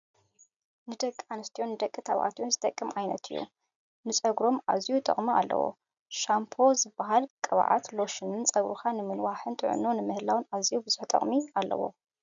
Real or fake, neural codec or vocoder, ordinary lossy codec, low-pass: real; none; MP3, 96 kbps; 7.2 kHz